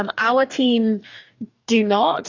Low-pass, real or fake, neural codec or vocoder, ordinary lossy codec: 7.2 kHz; fake; codec, 44.1 kHz, 2.6 kbps, DAC; AAC, 48 kbps